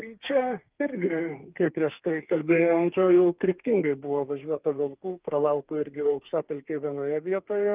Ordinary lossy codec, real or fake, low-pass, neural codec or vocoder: Opus, 24 kbps; fake; 3.6 kHz; codec, 32 kHz, 1.9 kbps, SNAC